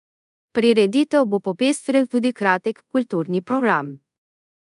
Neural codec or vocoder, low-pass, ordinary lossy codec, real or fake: codec, 24 kHz, 0.5 kbps, DualCodec; 10.8 kHz; none; fake